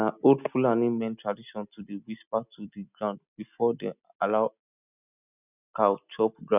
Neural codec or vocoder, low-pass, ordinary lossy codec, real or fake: none; 3.6 kHz; none; real